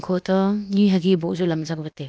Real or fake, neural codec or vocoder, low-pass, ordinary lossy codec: fake; codec, 16 kHz, about 1 kbps, DyCAST, with the encoder's durations; none; none